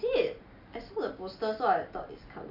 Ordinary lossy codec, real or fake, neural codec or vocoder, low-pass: none; real; none; 5.4 kHz